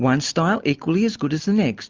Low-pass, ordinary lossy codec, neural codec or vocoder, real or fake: 7.2 kHz; Opus, 32 kbps; none; real